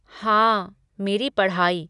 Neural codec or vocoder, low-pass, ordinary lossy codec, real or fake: none; 10.8 kHz; none; real